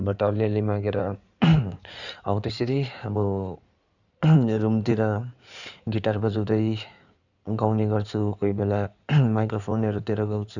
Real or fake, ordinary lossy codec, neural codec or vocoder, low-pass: fake; none; codec, 16 kHz in and 24 kHz out, 2.2 kbps, FireRedTTS-2 codec; 7.2 kHz